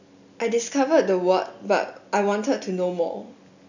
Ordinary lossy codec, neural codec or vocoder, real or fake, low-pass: none; none; real; 7.2 kHz